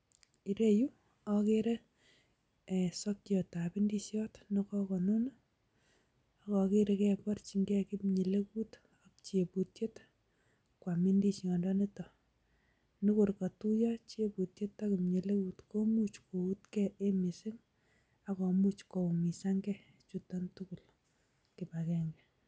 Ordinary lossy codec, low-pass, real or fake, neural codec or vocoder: none; none; real; none